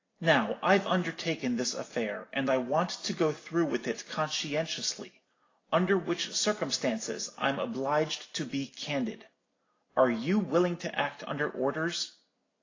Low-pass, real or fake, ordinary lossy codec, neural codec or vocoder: 7.2 kHz; real; AAC, 32 kbps; none